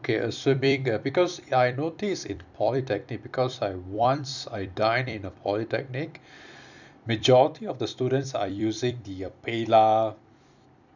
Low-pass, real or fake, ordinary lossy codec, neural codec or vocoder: 7.2 kHz; fake; none; vocoder, 44.1 kHz, 128 mel bands every 256 samples, BigVGAN v2